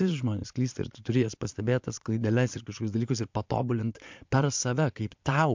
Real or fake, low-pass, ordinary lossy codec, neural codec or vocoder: fake; 7.2 kHz; MP3, 64 kbps; vocoder, 22.05 kHz, 80 mel bands, Vocos